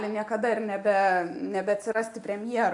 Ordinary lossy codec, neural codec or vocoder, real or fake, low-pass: AAC, 64 kbps; none; real; 10.8 kHz